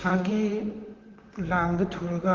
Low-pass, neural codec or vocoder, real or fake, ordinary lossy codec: 7.2 kHz; vocoder, 44.1 kHz, 128 mel bands, Pupu-Vocoder; fake; Opus, 16 kbps